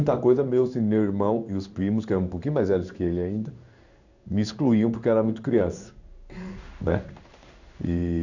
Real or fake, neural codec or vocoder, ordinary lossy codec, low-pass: fake; codec, 16 kHz in and 24 kHz out, 1 kbps, XY-Tokenizer; none; 7.2 kHz